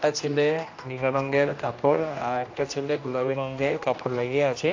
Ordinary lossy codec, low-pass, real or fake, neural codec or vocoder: AAC, 48 kbps; 7.2 kHz; fake; codec, 16 kHz, 1 kbps, X-Codec, HuBERT features, trained on general audio